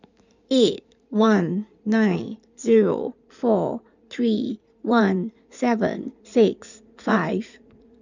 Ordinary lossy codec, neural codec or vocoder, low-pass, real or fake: none; codec, 16 kHz in and 24 kHz out, 2.2 kbps, FireRedTTS-2 codec; 7.2 kHz; fake